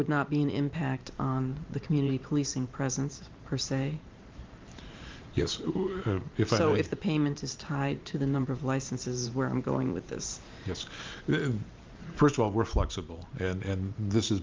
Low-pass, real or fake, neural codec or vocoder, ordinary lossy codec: 7.2 kHz; fake; vocoder, 44.1 kHz, 128 mel bands every 512 samples, BigVGAN v2; Opus, 24 kbps